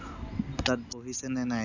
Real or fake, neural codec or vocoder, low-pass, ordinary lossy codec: real; none; 7.2 kHz; none